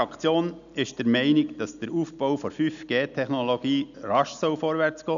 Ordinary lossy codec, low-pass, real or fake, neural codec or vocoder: none; 7.2 kHz; real; none